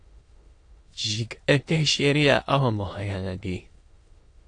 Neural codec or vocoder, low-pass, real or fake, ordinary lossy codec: autoencoder, 22.05 kHz, a latent of 192 numbers a frame, VITS, trained on many speakers; 9.9 kHz; fake; AAC, 48 kbps